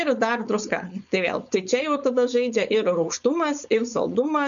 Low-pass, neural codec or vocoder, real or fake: 7.2 kHz; codec, 16 kHz, 4.8 kbps, FACodec; fake